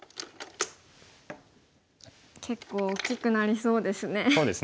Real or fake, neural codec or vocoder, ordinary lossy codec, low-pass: real; none; none; none